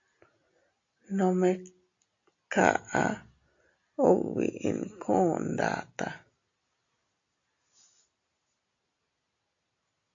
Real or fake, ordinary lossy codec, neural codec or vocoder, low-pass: real; AAC, 48 kbps; none; 7.2 kHz